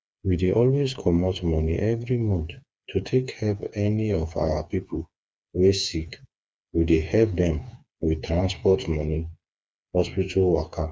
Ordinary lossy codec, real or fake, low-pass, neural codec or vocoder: none; fake; none; codec, 16 kHz, 4 kbps, FreqCodec, smaller model